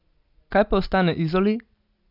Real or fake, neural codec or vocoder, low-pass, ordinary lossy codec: real; none; 5.4 kHz; AAC, 48 kbps